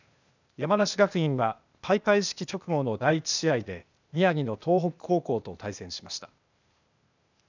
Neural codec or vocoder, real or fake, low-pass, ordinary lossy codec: codec, 16 kHz, 0.8 kbps, ZipCodec; fake; 7.2 kHz; none